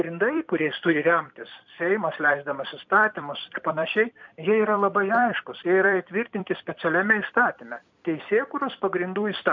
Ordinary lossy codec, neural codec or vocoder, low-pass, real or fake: MP3, 64 kbps; vocoder, 44.1 kHz, 128 mel bands every 256 samples, BigVGAN v2; 7.2 kHz; fake